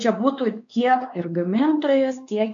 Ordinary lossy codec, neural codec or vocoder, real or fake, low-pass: MP3, 48 kbps; codec, 16 kHz, 2 kbps, X-Codec, WavLM features, trained on Multilingual LibriSpeech; fake; 7.2 kHz